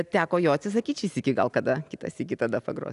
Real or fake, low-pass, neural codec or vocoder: real; 10.8 kHz; none